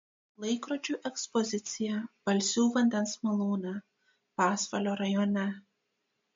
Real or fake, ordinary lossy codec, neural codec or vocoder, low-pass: real; MP3, 48 kbps; none; 7.2 kHz